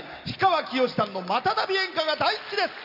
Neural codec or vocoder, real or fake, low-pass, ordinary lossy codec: none; real; 5.4 kHz; none